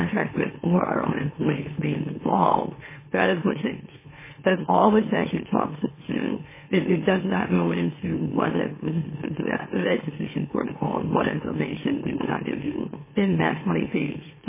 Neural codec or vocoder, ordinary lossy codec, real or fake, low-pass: autoencoder, 44.1 kHz, a latent of 192 numbers a frame, MeloTTS; MP3, 16 kbps; fake; 3.6 kHz